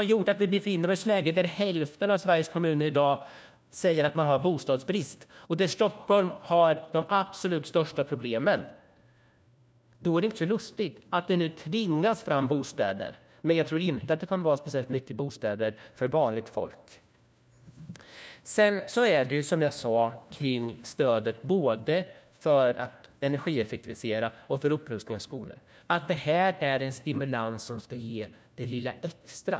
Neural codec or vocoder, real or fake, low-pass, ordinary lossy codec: codec, 16 kHz, 1 kbps, FunCodec, trained on LibriTTS, 50 frames a second; fake; none; none